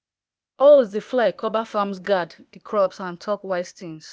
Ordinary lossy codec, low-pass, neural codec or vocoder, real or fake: none; none; codec, 16 kHz, 0.8 kbps, ZipCodec; fake